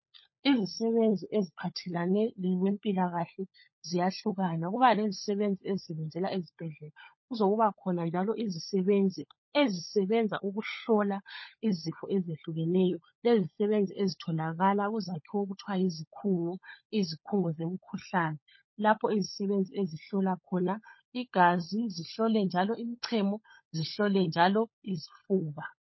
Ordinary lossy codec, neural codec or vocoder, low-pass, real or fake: MP3, 24 kbps; codec, 16 kHz, 16 kbps, FunCodec, trained on LibriTTS, 50 frames a second; 7.2 kHz; fake